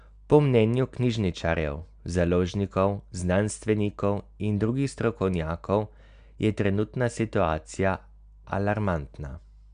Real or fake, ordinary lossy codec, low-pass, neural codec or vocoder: real; MP3, 96 kbps; 9.9 kHz; none